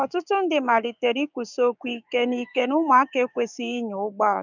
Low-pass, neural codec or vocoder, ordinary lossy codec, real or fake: 7.2 kHz; codec, 16 kHz, 6 kbps, DAC; none; fake